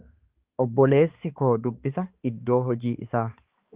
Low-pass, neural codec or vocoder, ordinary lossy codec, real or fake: 3.6 kHz; codec, 16 kHz, 4 kbps, X-Codec, HuBERT features, trained on balanced general audio; Opus, 32 kbps; fake